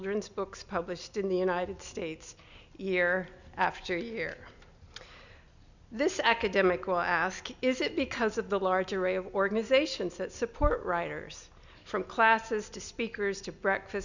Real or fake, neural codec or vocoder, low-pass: real; none; 7.2 kHz